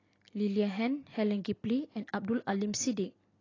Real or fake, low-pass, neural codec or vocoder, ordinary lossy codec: real; 7.2 kHz; none; AAC, 32 kbps